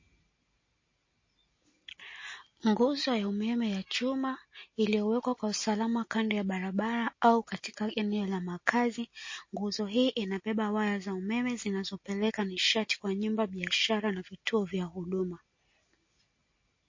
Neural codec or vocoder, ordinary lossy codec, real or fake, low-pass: none; MP3, 32 kbps; real; 7.2 kHz